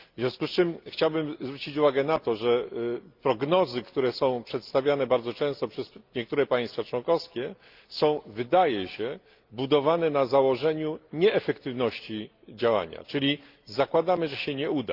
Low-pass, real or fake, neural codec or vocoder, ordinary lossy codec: 5.4 kHz; real; none; Opus, 32 kbps